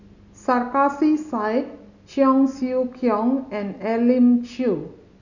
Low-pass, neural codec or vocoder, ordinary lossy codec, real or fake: 7.2 kHz; none; none; real